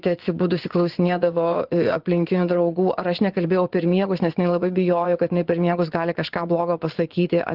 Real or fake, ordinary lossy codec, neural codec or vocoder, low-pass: fake; Opus, 16 kbps; vocoder, 24 kHz, 100 mel bands, Vocos; 5.4 kHz